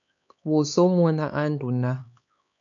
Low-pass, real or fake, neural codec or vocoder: 7.2 kHz; fake; codec, 16 kHz, 4 kbps, X-Codec, HuBERT features, trained on LibriSpeech